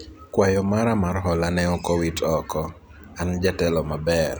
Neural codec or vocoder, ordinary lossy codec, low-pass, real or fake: none; none; none; real